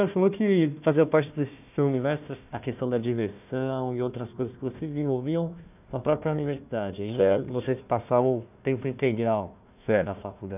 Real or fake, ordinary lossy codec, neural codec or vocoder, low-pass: fake; none; codec, 16 kHz, 1 kbps, FunCodec, trained on Chinese and English, 50 frames a second; 3.6 kHz